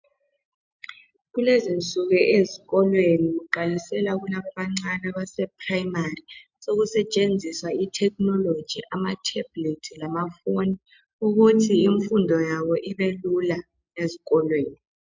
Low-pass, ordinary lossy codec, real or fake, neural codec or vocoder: 7.2 kHz; MP3, 64 kbps; real; none